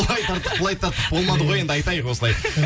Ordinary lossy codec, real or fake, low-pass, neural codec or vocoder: none; real; none; none